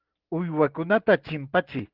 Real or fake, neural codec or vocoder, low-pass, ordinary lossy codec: real; none; 5.4 kHz; Opus, 16 kbps